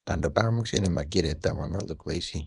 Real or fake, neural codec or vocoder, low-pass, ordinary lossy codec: fake; codec, 24 kHz, 0.9 kbps, WavTokenizer, small release; 10.8 kHz; none